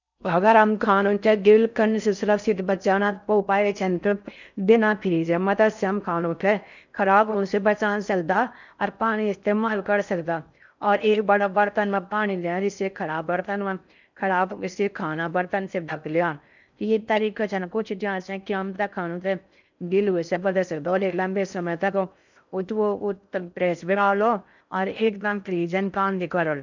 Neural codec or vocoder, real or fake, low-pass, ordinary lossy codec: codec, 16 kHz in and 24 kHz out, 0.6 kbps, FocalCodec, streaming, 4096 codes; fake; 7.2 kHz; none